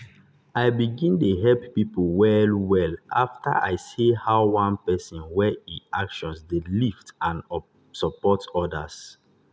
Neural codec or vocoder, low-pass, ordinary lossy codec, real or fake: none; none; none; real